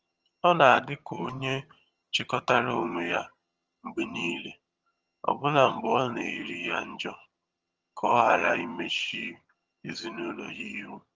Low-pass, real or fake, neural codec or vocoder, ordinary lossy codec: 7.2 kHz; fake; vocoder, 22.05 kHz, 80 mel bands, HiFi-GAN; Opus, 24 kbps